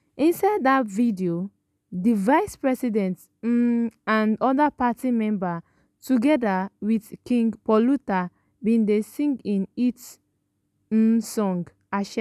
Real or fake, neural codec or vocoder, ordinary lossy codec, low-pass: real; none; none; 14.4 kHz